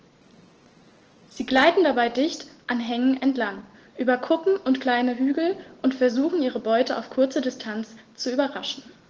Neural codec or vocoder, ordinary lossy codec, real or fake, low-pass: none; Opus, 16 kbps; real; 7.2 kHz